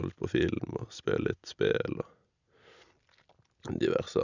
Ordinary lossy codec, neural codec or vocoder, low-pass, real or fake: none; none; 7.2 kHz; real